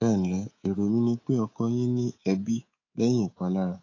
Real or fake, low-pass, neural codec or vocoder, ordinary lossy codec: fake; 7.2 kHz; codec, 24 kHz, 3.1 kbps, DualCodec; AAC, 32 kbps